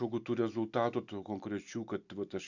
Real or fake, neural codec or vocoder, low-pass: real; none; 7.2 kHz